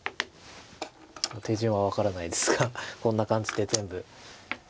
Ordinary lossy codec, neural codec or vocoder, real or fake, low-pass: none; none; real; none